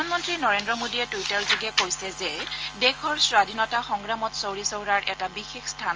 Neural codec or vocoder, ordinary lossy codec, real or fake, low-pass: none; Opus, 24 kbps; real; 7.2 kHz